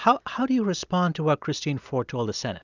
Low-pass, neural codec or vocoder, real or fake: 7.2 kHz; none; real